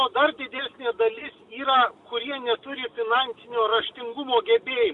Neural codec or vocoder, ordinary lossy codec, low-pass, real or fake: none; AAC, 64 kbps; 10.8 kHz; real